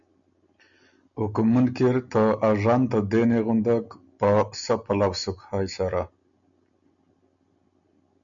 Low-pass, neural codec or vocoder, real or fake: 7.2 kHz; none; real